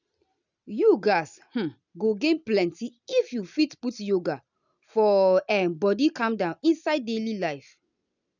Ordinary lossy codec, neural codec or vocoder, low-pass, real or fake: none; none; 7.2 kHz; real